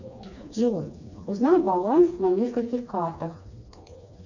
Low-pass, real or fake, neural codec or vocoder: 7.2 kHz; fake; codec, 16 kHz, 2 kbps, FreqCodec, smaller model